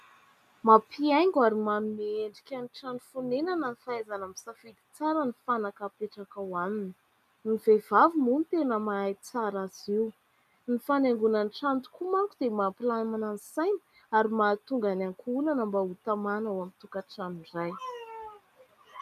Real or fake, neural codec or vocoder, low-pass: real; none; 14.4 kHz